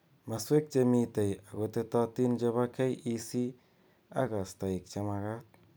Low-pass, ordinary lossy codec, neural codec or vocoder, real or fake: none; none; vocoder, 44.1 kHz, 128 mel bands every 256 samples, BigVGAN v2; fake